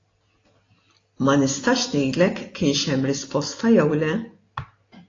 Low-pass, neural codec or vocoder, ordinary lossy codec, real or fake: 7.2 kHz; none; AAC, 32 kbps; real